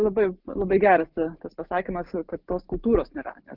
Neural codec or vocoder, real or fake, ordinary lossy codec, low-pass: none; real; Opus, 32 kbps; 5.4 kHz